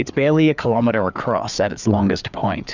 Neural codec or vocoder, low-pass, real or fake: codec, 16 kHz, 4 kbps, FreqCodec, larger model; 7.2 kHz; fake